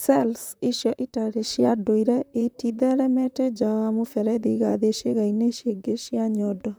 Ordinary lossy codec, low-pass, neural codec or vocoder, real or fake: none; none; vocoder, 44.1 kHz, 128 mel bands, Pupu-Vocoder; fake